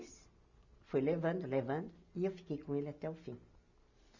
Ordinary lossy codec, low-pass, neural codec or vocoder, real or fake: none; 7.2 kHz; none; real